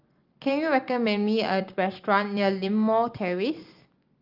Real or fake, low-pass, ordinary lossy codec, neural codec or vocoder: real; 5.4 kHz; Opus, 32 kbps; none